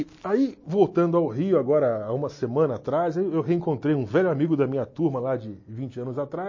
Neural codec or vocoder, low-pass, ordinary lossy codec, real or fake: none; 7.2 kHz; MP3, 32 kbps; real